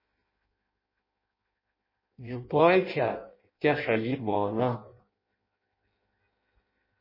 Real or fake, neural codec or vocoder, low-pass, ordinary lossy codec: fake; codec, 16 kHz in and 24 kHz out, 0.6 kbps, FireRedTTS-2 codec; 5.4 kHz; MP3, 24 kbps